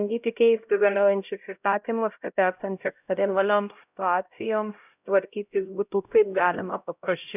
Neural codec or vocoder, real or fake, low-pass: codec, 16 kHz, 0.5 kbps, X-Codec, HuBERT features, trained on LibriSpeech; fake; 3.6 kHz